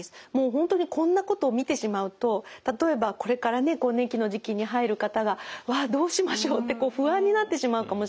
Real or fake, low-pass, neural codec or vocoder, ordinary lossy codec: real; none; none; none